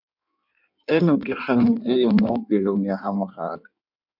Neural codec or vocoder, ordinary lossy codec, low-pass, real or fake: codec, 16 kHz in and 24 kHz out, 1.1 kbps, FireRedTTS-2 codec; MP3, 48 kbps; 5.4 kHz; fake